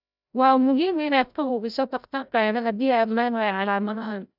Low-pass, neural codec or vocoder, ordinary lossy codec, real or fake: 5.4 kHz; codec, 16 kHz, 0.5 kbps, FreqCodec, larger model; none; fake